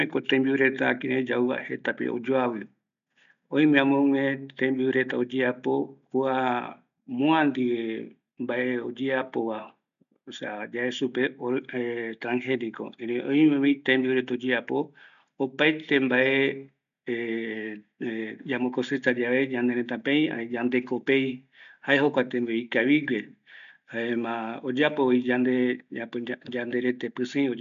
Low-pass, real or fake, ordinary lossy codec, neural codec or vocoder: 7.2 kHz; real; none; none